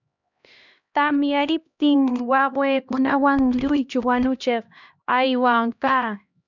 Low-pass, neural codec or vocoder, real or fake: 7.2 kHz; codec, 16 kHz, 1 kbps, X-Codec, HuBERT features, trained on LibriSpeech; fake